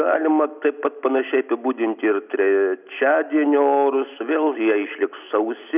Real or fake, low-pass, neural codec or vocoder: real; 3.6 kHz; none